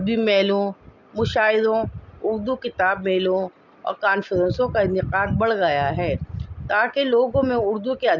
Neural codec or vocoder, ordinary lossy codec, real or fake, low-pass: none; none; real; 7.2 kHz